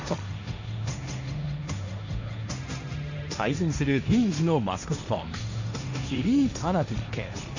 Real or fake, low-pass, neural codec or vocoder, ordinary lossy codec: fake; 7.2 kHz; codec, 16 kHz, 1.1 kbps, Voila-Tokenizer; none